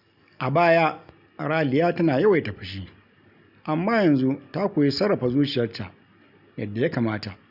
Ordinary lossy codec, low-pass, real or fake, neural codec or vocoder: none; 5.4 kHz; real; none